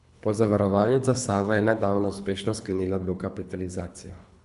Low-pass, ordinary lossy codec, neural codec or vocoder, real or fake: 10.8 kHz; none; codec, 24 kHz, 3 kbps, HILCodec; fake